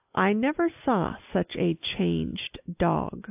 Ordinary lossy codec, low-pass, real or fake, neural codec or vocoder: AAC, 32 kbps; 3.6 kHz; real; none